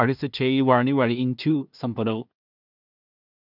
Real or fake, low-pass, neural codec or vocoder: fake; 5.4 kHz; codec, 16 kHz in and 24 kHz out, 0.4 kbps, LongCat-Audio-Codec, two codebook decoder